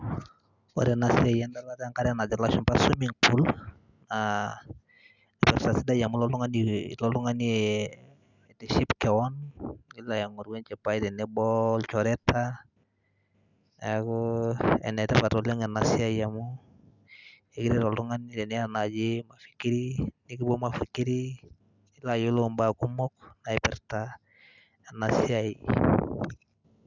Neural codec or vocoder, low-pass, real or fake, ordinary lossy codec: none; 7.2 kHz; real; none